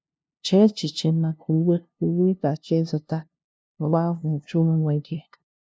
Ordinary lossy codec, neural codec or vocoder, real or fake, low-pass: none; codec, 16 kHz, 0.5 kbps, FunCodec, trained on LibriTTS, 25 frames a second; fake; none